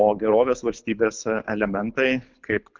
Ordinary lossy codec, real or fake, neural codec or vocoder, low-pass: Opus, 24 kbps; fake; codec, 24 kHz, 6 kbps, HILCodec; 7.2 kHz